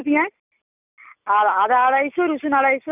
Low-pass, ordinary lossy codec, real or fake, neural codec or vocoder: 3.6 kHz; none; real; none